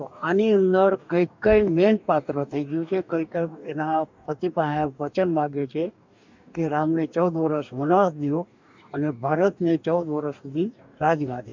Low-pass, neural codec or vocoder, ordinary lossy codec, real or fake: 7.2 kHz; codec, 44.1 kHz, 2.6 kbps, DAC; MP3, 64 kbps; fake